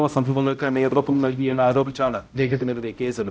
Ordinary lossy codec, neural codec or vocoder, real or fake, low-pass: none; codec, 16 kHz, 0.5 kbps, X-Codec, HuBERT features, trained on balanced general audio; fake; none